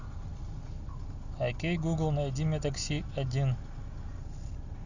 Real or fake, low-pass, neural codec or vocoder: real; 7.2 kHz; none